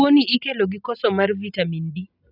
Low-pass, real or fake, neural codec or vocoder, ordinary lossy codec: 5.4 kHz; real; none; none